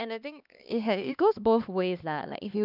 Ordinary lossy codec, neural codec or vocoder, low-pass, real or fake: none; codec, 16 kHz, 2 kbps, X-Codec, HuBERT features, trained on LibriSpeech; 5.4 kHz; fake